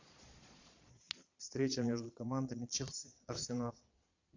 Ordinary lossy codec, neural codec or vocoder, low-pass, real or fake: AAC, 48 kbps; vocoder, 22.05 kHz, 80 mel bands, Vocos; 7.2 kHz; fake